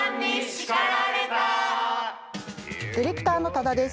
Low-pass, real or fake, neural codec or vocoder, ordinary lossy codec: none; real; none; none